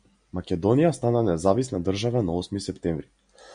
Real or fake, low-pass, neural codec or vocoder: real; 9.9 kHz; none